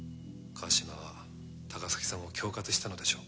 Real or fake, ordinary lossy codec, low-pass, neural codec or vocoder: real; none; none; none